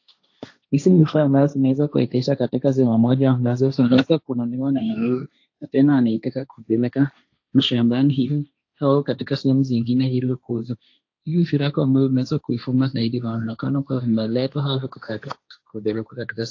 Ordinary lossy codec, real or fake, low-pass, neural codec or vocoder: AAC, 48 kbps; fake; 7.2 kHz; codec, 16 kHz, 1.1 kbps, Voila-Tokenizer